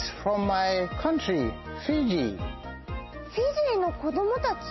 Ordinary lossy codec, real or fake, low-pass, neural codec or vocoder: MP3, 24 kbps; real; 7.2 kHz; none